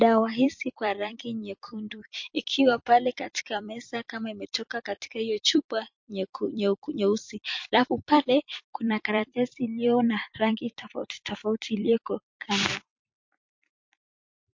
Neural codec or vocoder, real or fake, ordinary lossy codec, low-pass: vocoder, 44.1 kHz, 128 mel bands every 256 samples, BigVGAN v2; fake; MP3, 48 kbps; 7.2 kHz